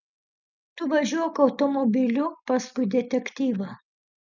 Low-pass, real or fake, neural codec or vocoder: 7.2 kHz; real; none